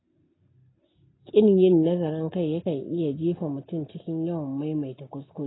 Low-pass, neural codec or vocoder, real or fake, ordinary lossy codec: 7.2 kHz; none; real; AAC, 16 kbps